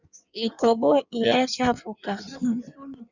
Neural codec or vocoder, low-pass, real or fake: codec, 16 kHz in and 24 kHz out, 1.1 kbps, FireRedTTS-2 codec; 7.2 kHz; fake